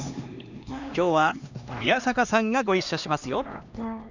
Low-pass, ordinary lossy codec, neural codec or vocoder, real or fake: 7.2 kHz; none; codec, 16 kHz, 2 kbps, X-Codec, HuBERT features, trained on LibriSpeech; fake